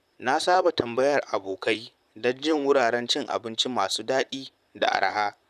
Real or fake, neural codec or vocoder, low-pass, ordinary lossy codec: fake; vocoder, 44.1 kHz, 128 mel bands, Pupu-Vocoder; 14.4 kHz; none